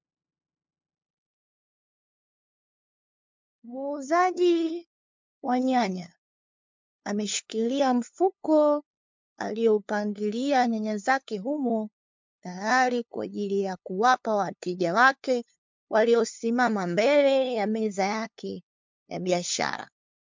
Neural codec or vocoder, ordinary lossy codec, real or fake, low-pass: codec, 16 kHz, 2 kbps, FunCodec, trained on LibriTTS, 25 frames a second; MP3, 64 kbps; fake; 7.2 kHz